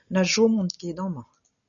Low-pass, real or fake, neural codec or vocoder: 7.2 kHz; real; none